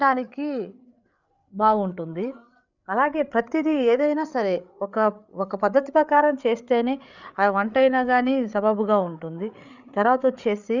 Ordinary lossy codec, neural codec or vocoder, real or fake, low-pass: none; codec, 16 kHz, 8 kbps, FreqCodec, larger model; fake; 7.2 kHz